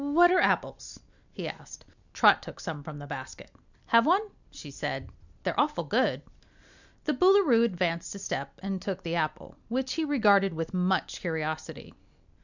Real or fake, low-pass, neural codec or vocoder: real; 7.2 kHz; none